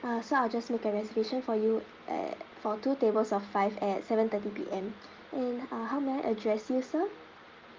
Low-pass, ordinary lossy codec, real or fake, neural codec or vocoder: 7.2 kHz; Opus, 32 kbps; real; none